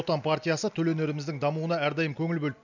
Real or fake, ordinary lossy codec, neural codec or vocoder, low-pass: real; none; none; 7.2 kHz